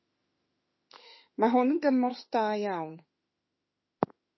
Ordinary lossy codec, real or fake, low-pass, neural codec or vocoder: MP3, 24 kbps; fake; 7.2 kHz; autoencoder, 48 kHz, 32 numbers a frame, DAC-VAE, trained on Japanese speech